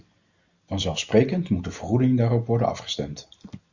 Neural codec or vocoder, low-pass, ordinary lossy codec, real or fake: none; 7.2 kHz; Opus, 64 kbps; real